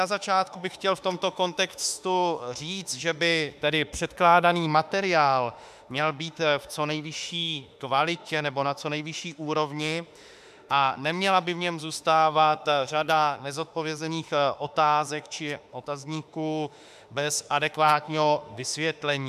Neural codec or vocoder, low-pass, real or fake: autoencoder, 48 kHz, 32 numbers a frame, DAC-VAE, trained on Japanese speech; 14.4 kHz; fake